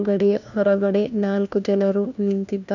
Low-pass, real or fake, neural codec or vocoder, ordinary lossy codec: 7.2 kHz; fake; codec, 16 kHz, 1 kbps, FunCodec, trained on LibriTTS, 50 frames a second; none